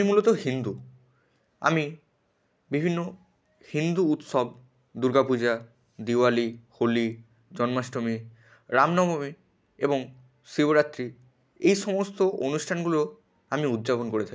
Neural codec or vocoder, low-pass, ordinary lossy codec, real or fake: none; none; none; real